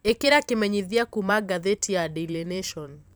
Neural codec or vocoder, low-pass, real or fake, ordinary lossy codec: vocoder, 44.1 kHz, 128 mel bands every 512 samples, BigVGAN v2; none; fake; none